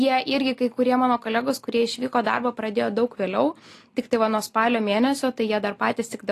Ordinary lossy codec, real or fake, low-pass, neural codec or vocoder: AAC, 48 kbps; real; 14.4 kHz; none